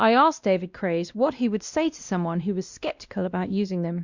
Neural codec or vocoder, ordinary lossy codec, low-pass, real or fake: codec, 16 kHz, 1 kbps, X-Codec, WavLM features, trained on Multilingual LibriSpeech; Opus, 64 kbps; 7.2 kHz; fake